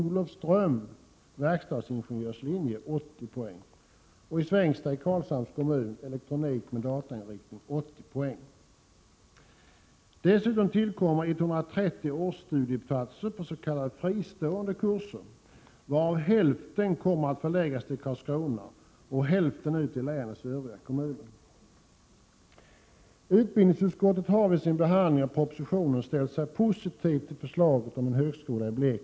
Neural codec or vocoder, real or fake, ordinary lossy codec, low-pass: none; real; none; none